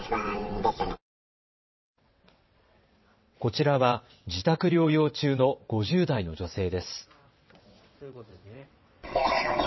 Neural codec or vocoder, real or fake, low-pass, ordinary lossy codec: vocoder, 22.05 kHz, 80 mel bands, WaveNeXt; fake; 7.2 kHz; MP3, 24 kbps